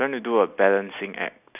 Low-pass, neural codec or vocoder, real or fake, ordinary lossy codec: 3.6 kHz; none; real; none